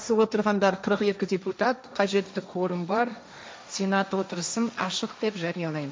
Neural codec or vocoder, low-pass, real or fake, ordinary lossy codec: codec, 16 kHz, 1.1 kbps, Voila-Tokenizer; none; fake; none